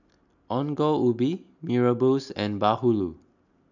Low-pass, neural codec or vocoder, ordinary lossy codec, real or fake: 7.2 kHz; none; none; real